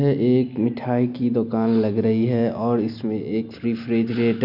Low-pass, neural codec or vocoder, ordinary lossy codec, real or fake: 5.4 kHz; none; none; real